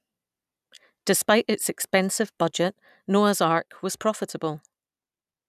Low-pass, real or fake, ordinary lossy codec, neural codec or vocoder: 14.4 kHz; real; none; none